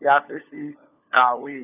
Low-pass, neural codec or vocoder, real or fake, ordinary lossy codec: 3.6 kHz; codec, 16 kHz, 4 kbps, FunCodec, trained on LibriTTS, 50 frames a second; fake; none